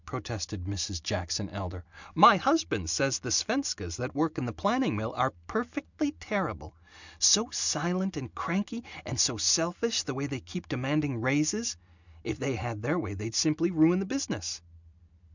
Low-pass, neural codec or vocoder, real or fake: 7.2 kHz; none; real